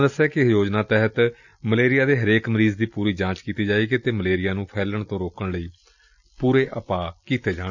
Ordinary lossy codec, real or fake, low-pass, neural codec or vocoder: none; real; 7.2 kHz; none